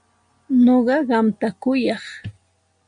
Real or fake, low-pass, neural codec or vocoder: real; 9.9 kHz; none